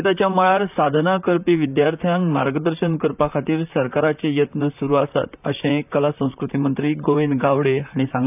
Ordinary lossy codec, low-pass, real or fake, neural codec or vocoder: none; 3.6 kHz; fake; vocoder, 44.1 kHz, 128 mel bands, Pupu-Vocoder